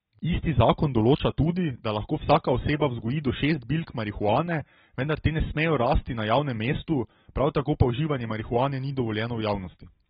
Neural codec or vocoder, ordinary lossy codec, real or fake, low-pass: none; AAC, 16 kbps; real; 7.2 kHz